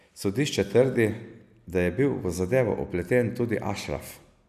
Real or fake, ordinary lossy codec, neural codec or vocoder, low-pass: real; none; none; 14.4 kHz